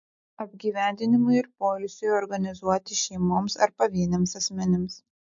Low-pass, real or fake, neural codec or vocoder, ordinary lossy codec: 7.2 kHz; real; none; MP3, 48 kbps